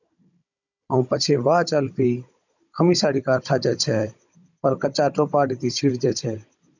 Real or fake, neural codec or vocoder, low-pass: fake; codec, 16 kHz, 16 kbps, FunCodec, trained on Chinese and English, 50 frames a second; 7.2 kHz